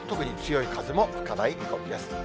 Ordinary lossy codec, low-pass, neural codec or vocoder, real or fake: none; none; none; real